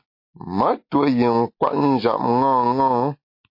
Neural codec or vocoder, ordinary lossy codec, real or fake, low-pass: none; MP3, 32 kbps; real; 5.4 kHz